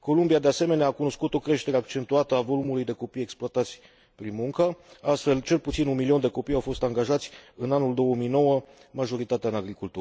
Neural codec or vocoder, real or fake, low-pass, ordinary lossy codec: none; real; none; none